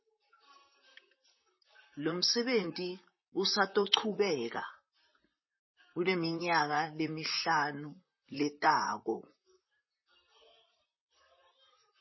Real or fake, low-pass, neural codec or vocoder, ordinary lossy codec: fake; 7.2 kHz; vocoder, 44.1 kHz, 128 mel bands, Pupu-Vocoder; MP3, 24 kbps